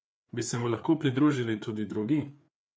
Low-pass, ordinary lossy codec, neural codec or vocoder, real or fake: none; none; codec, 16 kHz, 4 kbps, FreqCodec, larger model; fake